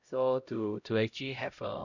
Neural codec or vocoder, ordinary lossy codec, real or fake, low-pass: codec, 16 kHz, 0.5 kbps, X-Codec, HuBERT features, trained on LibriSpeech; none; fake; 7.2 kHz